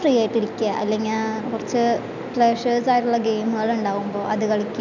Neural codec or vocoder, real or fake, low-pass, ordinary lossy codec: none; real; 7.2 kHz; none